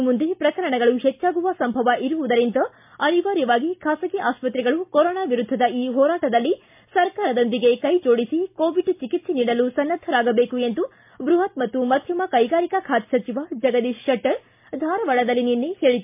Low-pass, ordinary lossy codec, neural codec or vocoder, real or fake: 3.6 kHz; MP3, 32 kbps; none; real